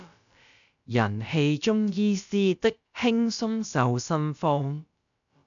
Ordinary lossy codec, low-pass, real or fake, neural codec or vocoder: MP3, 96 kbps; 7.2 kHz; fake; codec, 16 kHz, about 1 kbps, DyCAST, with the encoder's durations